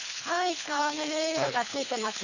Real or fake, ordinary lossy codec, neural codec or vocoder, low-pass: fake; none; codec, 24 kHz, 3 kbps, HILCodec; 7.2 kHz